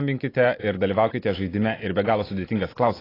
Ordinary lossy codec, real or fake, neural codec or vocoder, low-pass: AAC, 24 kbps; real; none; 5.4 kHz